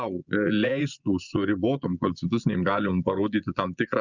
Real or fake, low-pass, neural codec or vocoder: fake; 7.2 kHz; vocoder, 24 kHz, 100 mel bands, Vocos